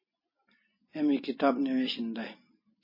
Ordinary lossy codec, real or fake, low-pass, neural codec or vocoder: MP3, 24 kbps; real; 5.4 kHz; none